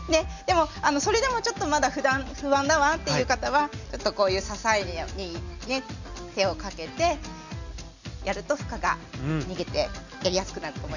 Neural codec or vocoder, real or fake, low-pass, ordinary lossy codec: none; real; 7.2 kHz; none